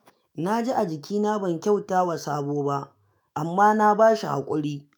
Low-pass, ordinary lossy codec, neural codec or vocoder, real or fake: none; none; autoencoder, 48 kHz, 128 numbers a frame, DAC-VAE, trained on Japanese speech; fake